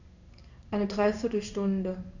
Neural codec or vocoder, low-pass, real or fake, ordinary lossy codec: codec, 16 kHz in and 24 kHz out, 1 kbps, XY-Tokenizer; 7.2 kHz; fake; none